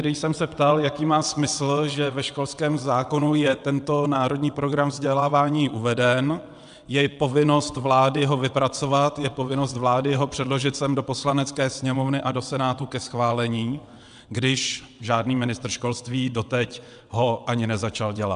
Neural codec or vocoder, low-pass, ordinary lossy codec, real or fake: vocoder, 22.05 kHz, 80 mel bands, WaveNeXt; 9.9 kHz; AAC, 96 kbps; fake